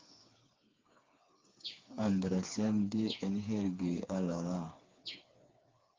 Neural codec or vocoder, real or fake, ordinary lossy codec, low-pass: codec, 16 kHz, 4 kbps, FreqCodec, smaller model; fake; Opus, 32 kbps; 7.2 kHz